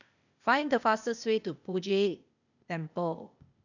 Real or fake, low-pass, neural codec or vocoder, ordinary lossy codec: fake; 7.2 kHz; codec, 16 kHz, 0.8 kbps, ZipCodec; none